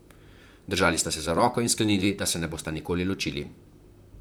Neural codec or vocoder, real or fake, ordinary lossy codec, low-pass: vocoder, 44.1 kHz, 128 mel bands, Pupu-Vocoder; fake; none; none